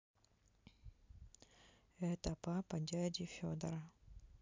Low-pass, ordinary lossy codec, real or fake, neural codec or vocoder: 7.2 kHz; MP3, 64 kbps; real; none